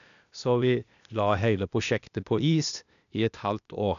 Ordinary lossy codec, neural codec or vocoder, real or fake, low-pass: none; codec, 16 kHz, 0.8 kbps, ZipCodec; fake; 7.2 kHz